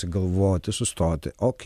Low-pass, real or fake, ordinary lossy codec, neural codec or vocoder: 14.4 kHz; real; MP3, 96 kbps; none